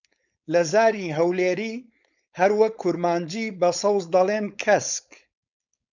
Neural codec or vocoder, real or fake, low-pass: codec, 16 kHz, 4.8 kbps, FACodec; fake; 7.2 kHz